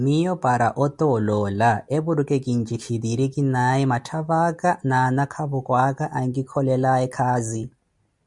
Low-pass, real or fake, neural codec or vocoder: 10.8 kHz; real; none